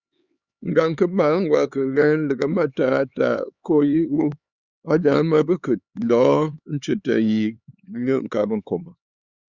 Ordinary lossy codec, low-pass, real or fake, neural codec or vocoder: Opus, 64 kbps; 7.2 kHz; fake; codec, 16 kHz, 4 kbps, X-Codec, HuBERT features, trained on LibriSpeech